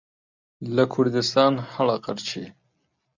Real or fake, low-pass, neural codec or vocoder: real; 7.2 kHz; none